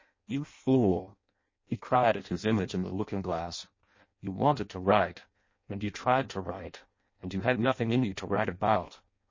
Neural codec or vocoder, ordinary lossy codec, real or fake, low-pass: codec, 16 kHz in and 24 kHz out, 0.6 kbps, FireRedTTS-2 codec; MP3, 32 kbps; fake; 7.2 kHz